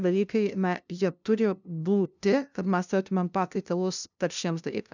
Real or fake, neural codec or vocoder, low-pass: fake; codec, 16 kHz, 0.5 kbps, FunCodec, trained on LibriTTS, 25 frames a second; 7.2 kHz